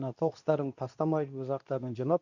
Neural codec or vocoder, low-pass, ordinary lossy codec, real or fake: codec, 24 kHz, 0.9 kbps, WavTokenizer, medium speech release version 2; 7.2 kHz; none; fake